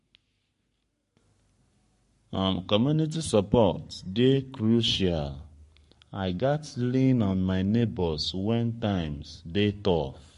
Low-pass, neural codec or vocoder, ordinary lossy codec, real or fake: 14.4 kHz; codec, 44.1 kHz, 7.8 kbps, Pupu-Codec; MP3, 48 kbps; fake